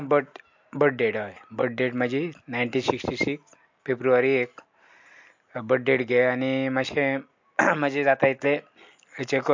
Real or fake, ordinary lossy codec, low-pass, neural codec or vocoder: real; MP3, 48 kbps; 7.2 kHz; none